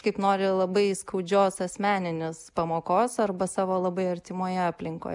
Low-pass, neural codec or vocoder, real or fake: 10.8 kHz; none; real